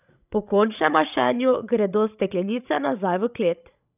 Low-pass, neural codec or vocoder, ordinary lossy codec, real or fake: 3.6 kHz; codec, 16 kHz, 16 kbps, FreqCodec, larger model; none; fake